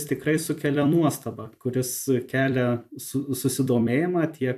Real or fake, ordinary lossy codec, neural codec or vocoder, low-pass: fake; AAC, 96 kbps; vocoder, 44.1 kHz, 128 mel bands every 256 samples, BigVGAN v2; 14.4 kHz